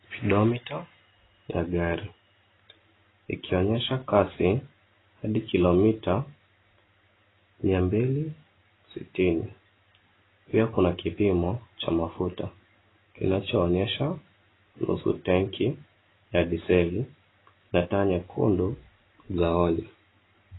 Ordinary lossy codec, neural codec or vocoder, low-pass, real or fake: AAC, 16 kbps; none; 7.2 kHz; real